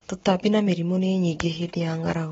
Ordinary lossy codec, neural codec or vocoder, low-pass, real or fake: AAC, 24 kbps; none; 19.8 kHz; real